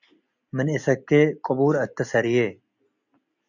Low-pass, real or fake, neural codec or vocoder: 7.2 kHz; real; none